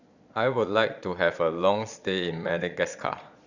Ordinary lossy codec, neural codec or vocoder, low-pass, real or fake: none; vocoder, 22.05 kHz, 80 mel bands, Vocos; 7.2 kHz; fake